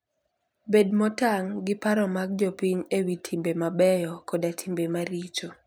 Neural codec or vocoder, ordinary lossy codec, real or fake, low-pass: vocoder, 44.1 kHz, 128 mel bands every 256 samples, BigVGAN v2; none; fake; none